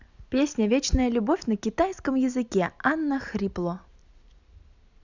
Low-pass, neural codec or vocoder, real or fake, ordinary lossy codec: 7.2 kHz; none; real; none